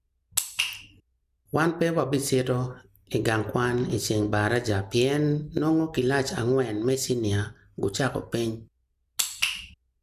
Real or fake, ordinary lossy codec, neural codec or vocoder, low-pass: real; none; none; 14.4 kHz